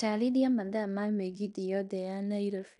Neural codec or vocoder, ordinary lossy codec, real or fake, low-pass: codec, 16 kHz in and 24 kHz out, 0.9 kbps, LongCat-Audio-Codec, fine tuned four codebook decoder; none; fake; 10.8 kHz